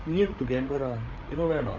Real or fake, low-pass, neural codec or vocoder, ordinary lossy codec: fake; 7.2 kHz; codec, 16 kHz, 16 kbps, FreqCodec, larger model; Opus, 64 kbps